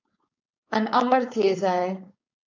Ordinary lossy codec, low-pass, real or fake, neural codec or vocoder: AAC, 48 kbps; 7.2 kHz; fake; codec, 16 kHz, 4.8 kbps, FACodec